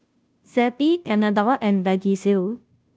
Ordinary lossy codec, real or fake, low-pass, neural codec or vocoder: none; fake; none; codec, 16 kHz, 0.5 kbps, FunCodec, trained on Chinese and English, 25 frames a second